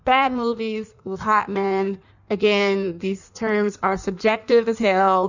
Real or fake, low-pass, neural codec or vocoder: fake; 7.2 kHz; codec, 16 kHz in and 24 kHz out, 1.1 kbps, FireRedTTS-2 codec